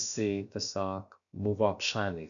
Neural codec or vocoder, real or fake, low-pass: codec, 16 kHz, about 1 kbps, DyCAST, with the encoder's durations; fake; 7.2 kHz